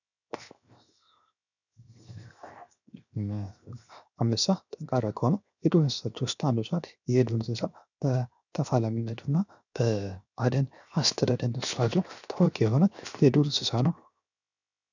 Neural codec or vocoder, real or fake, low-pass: codec, 16 kHz, 0.7 kbps, FocalCodec; fake; 7.2 kHz